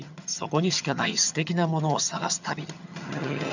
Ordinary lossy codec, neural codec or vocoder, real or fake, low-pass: none; vocoder, 22.05 kHz, 80 mel bands, HiFi-GAN; fake; 7.2 kHz